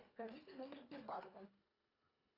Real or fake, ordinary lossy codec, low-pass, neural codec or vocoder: fake; AAC, 32 kbps; 5.4 kHz; codec, 24 kHz, 1.5 kbps, HILCodec